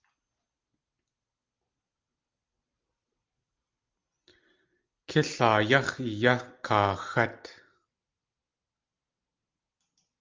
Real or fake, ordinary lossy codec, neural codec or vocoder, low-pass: real; Opus, 32 kbps; none; 7.2 kHz